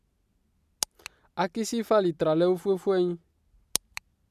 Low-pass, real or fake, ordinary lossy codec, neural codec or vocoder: 14.4 kHz; real; MP3, 96 kbps; none